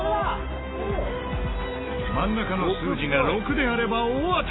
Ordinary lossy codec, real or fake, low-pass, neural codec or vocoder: AAC, 16 kbps; real; 7.2 kHz; none